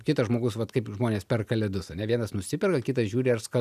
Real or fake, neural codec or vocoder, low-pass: real; none; 14.4 kHz